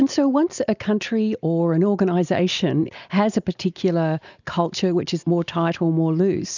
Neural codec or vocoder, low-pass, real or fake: none; 7.2 kHz; real